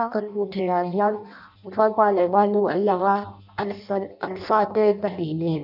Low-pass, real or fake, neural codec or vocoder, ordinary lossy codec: 5.4 kHz; fake; codec, 16 kHz in and 24 kHz out, 0.6 kbps, FireRedTTS-2 codec; none